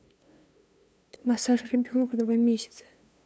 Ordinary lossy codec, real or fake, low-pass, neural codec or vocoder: none; fake; none; codec, 16 kHz, 2 kbps, FunCodec, trained on LibriTTS, 25 frames a second